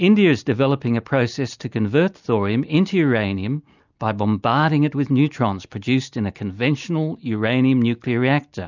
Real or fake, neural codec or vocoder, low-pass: real; none; 7.2 kHz